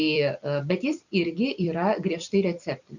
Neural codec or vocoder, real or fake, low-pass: vocoder, 44.1 kHz, 128 mel bands every 256 samples, BigVGAN v2; fake; 7.2 kHz